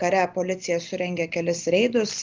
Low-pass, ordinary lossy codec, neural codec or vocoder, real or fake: 7.2 kHz; Opus, 32 kbps; none; real